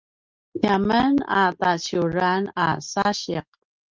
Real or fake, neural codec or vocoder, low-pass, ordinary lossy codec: real; none; 7.2 kHz; Opus, 24 kbps